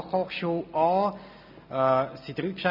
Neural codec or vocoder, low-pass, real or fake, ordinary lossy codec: none; 5.4 kHz; real; none